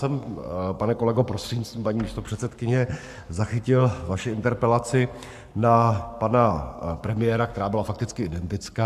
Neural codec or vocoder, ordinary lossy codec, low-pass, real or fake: codec, 44.1 kHz, 7.8 kbps, Pupu-Codec; AAC, 96 kbps; 14.4 kHz; fake